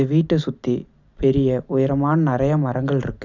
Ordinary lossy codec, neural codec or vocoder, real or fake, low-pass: none; none; real; 7.2 kHz